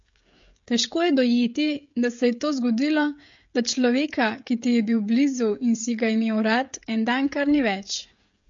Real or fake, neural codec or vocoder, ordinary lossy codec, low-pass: fake; codec, 16 kHz, 16 kbps, FreqCodec, smaller model; MP3, 48 kbps; 7.2 kHz